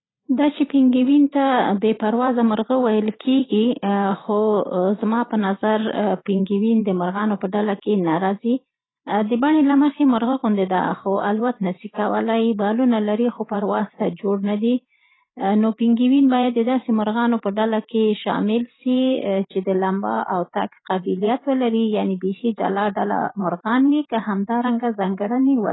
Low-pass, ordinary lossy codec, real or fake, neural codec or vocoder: 7.2 kHz; AAC, 16 kbps; fake; vocoder, 44.1 kHz, 128 mel bands every 512 samples, BigVGAN v2